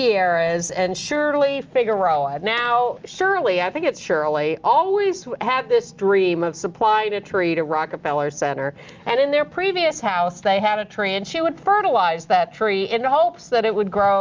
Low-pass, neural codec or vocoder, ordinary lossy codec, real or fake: 7.2 kHz; none; Opus, 24 kbps; real